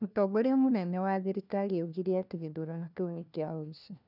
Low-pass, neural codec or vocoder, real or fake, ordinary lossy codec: 5.4 kHz; codec, 16 kHz, 1 kbps, FunCodec, trained on LibriTTS, 50 frames a second; fake; none